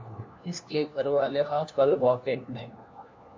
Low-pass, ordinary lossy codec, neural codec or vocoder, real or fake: 7.2 kHz; MP3, 64 kbps; codec, 16 kHz, 1 kbps, FunCodec, trained on LibriTTS, 50 frames a second; fake